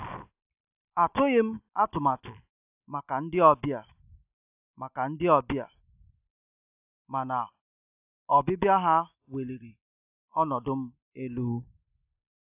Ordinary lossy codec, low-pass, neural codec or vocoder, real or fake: AAC, 32 kbps; 3.6 kHz; none; real